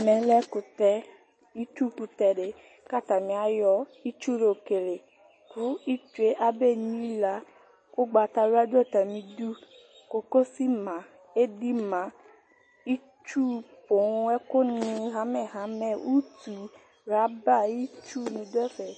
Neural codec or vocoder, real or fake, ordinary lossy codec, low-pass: none; real; MP3, 32 kbps; 9.9 kHz